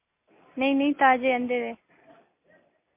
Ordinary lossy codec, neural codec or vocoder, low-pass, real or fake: MP3, 24 kbps; none; 3.6 kHz; real